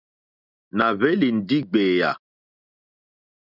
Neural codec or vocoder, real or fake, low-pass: none; real; 5.4 kHz